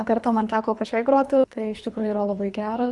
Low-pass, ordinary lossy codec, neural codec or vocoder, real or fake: 10.8 kHz; Opus, 64 kbps; codec, 24 kHz, 3 kbps, HILCodec; fake